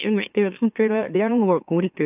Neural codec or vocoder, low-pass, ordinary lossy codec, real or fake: autoencoder, 44.1 kHz, a latent of 192 numbers a frame, MeloTTS; 3.6 kHz; AAC, 32 kbps; fake